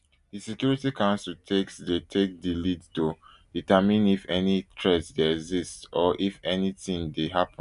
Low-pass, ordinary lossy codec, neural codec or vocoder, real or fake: 10.8 kHz; none; none; real